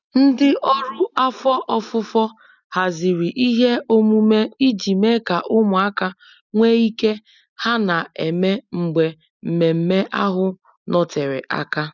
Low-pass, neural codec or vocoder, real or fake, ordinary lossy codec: 7.2 kHz; none; real; none